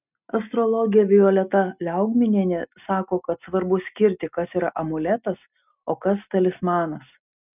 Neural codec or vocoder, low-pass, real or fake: none; 3.6 kHz; real